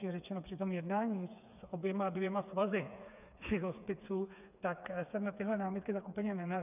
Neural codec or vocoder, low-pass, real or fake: codec, 16 kHz, 4 kbps, FreqCodec, smaller model; 3.6 kHz; fake